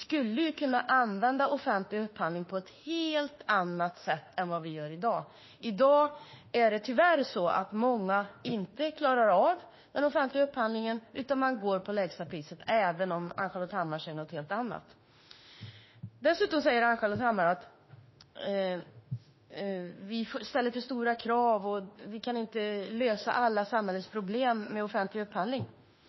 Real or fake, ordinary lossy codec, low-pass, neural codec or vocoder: fake; MP3, 24 kbps; 7.2 kHz; autoencoder, 48 kHz, 32 numbers a frame, DAC-VAE, trained on Japanese speech